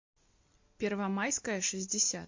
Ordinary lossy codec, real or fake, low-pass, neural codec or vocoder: MP3, 48 kbps; real; 7.2 kHz; none